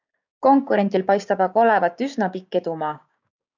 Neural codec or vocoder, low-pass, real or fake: codec, 16 kHz, 6 kbps, DAC; 7.2 kHz; fake